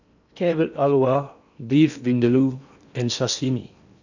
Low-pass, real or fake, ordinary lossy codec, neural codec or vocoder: 7.2 kHz; fake; none; codec, 16 kHz in and 24 kHz out, 0.8 kbps, FocalCodec, streaming, 65536 codes